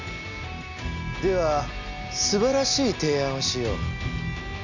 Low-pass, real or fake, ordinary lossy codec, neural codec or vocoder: 7.2 kHz; real; none; none